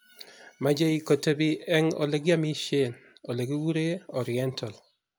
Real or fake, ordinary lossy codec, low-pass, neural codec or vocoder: real; none; none; none